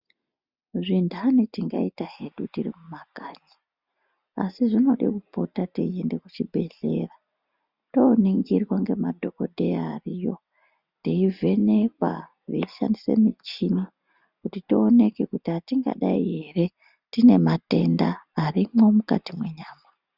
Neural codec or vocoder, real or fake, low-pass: none; real; 5.4 kHz